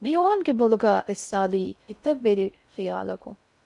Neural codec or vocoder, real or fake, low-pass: codec, 16 kHz in and 24 kHz out, 0.6 kbps, FocalCodec, streaming, 4096 codes; fake; 10.8 kHz